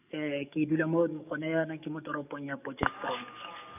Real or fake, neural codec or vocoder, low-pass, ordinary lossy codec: fake; codec, 44.1 kHz, 7.8 kbps, DAC; 3.6 kHz; none